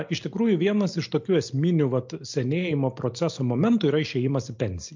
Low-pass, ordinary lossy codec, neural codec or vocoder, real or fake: 7.2 kHz; MP3, 48 kbps; none; real